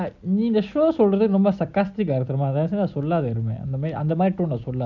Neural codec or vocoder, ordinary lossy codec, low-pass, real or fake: none; none; 7.2 kHz; real